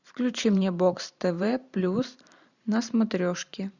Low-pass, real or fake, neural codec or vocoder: 7.2 kHz; fake; vocoder, 44.1 kHz, 128 mel bands every 512 samples, BigVGAN v2